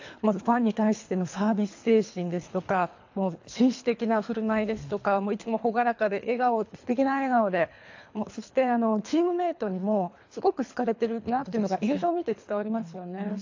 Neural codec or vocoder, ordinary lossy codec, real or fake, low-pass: codec, 24 kHz, 3 kbps, HILCodec; AAC, 48 kbps; fake; 7.2 kHz